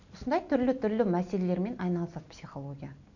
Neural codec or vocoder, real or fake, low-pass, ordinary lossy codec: none; real; 7.2 kHz; none